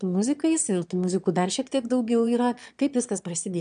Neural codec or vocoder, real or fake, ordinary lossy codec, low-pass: autoencoder, 22.05 kHz, a latent of 192 numbers a frame, VITS, trained on one speaker; fake; MP3, 96 kbps; 9.9 kHz